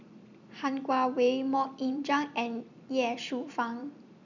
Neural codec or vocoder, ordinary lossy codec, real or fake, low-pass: vocoder, 44.1 kHz, 128 mel bands every 256 samples, BigVGAN v2; none; fake; 7.2 kHz